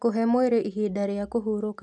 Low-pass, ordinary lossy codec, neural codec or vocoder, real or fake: 10.8 kHz; none; none; real